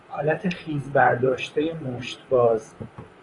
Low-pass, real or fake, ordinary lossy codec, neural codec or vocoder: 10.8 kHz; fake; AAC, 32 kbps; vocoder, 44.1 kHz, 128 mel bands, Pupu-Vocoder